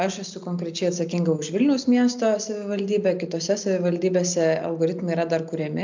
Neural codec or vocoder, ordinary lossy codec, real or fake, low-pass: none; MP3, 64 kbps; real; 7.2 kHz